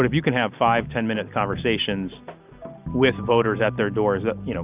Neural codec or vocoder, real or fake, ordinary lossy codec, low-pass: none; real; Opus, 24 kbps; 3.6 kHz